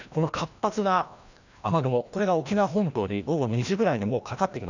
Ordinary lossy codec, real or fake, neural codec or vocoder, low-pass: none; fake; codec, 16 kHz, 1 kbps, FreqCodec, larger model; 7.2 kHz